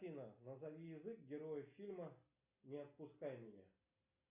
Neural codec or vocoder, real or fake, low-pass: none; real; 3.6 kHz